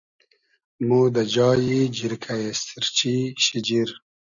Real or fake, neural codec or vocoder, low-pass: real; none; 7.2 kHz